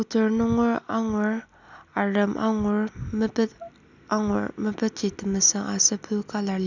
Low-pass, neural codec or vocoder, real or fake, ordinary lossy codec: 7.2 kHz; none; real; none